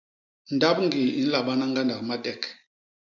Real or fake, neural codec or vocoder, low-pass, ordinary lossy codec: real; none; 7.2 kHz; MP3, 64 kbps